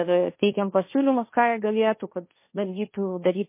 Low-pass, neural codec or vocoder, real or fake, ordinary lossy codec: 3.6 kHz; codec, 16 kHz, 1.1 kbps, Voila-Tokenizer; fake; MP3, 24 kbps